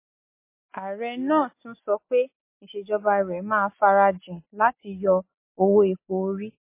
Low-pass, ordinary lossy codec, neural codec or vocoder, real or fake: 3.6 kHz; MP3, 24 kbps; none; real